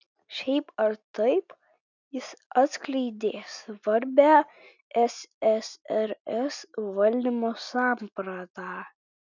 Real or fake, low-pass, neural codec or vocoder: real; 7.2 kHz; none